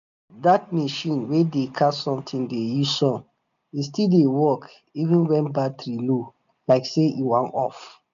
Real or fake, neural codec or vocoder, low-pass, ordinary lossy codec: real; none; 7.2 kHz; none